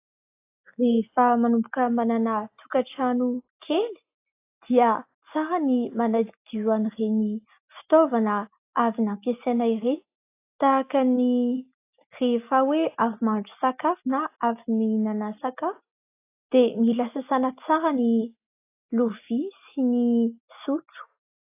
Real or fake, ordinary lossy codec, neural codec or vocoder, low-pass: real; AAC, 24 kbps; none; 3.6 kHz